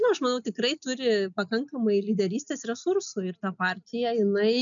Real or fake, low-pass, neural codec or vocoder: real; 7.2 kHz; none